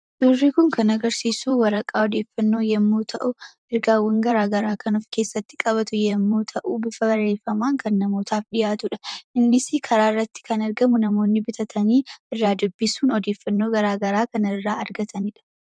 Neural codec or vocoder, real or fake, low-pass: vocoder, 44.1 kHz, 128 mel bands, Pupu-Vocoder; fake; 9.9 kHz